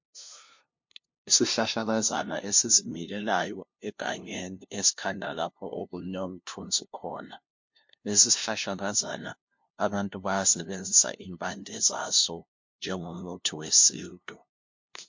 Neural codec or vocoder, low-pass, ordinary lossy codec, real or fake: codec, 16 kHz, 0.5 kbps, FunCodec, trained on LibriTTS, 25 frames a second; 7.2 kHz; MP3, 48 kbps; fake